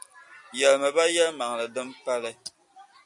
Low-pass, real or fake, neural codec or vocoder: 10.8 kHz; real; none